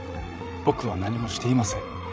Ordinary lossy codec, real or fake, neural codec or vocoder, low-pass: none; fake; codec, 16 kHz, 16 kbps, FreqCodec, larger model; none